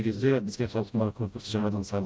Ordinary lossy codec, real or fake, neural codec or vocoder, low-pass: none; fake; codec, 16 kHz, 0.5 kbps, FreqCodec, smaller model; none